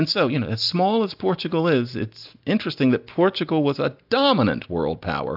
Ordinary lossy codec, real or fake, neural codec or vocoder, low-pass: AAC, 48 kbps; real; none; 5.4 kHz